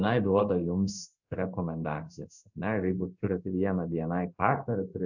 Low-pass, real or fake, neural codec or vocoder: 7.2 kHz; fake; codec, 16 kHz in and 24 kHz out, 1 kbps, XY-Tokenizer